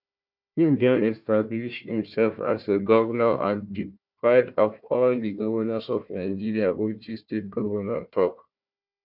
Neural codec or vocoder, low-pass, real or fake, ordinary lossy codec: codec, 16 kHz, 1 kbps, FunCodec, trained on Chinese and English, 50 frames a second; 5.4 kHz; fake; none